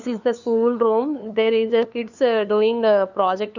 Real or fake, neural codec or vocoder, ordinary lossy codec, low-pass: fake; codec, 16 kHz, 2 kbps, FunCodec, trained on LibriTTS, 25 frames a second; none; 7.2 kHz